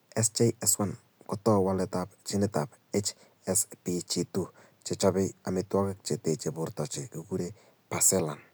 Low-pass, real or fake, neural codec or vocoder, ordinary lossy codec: none; real; none; none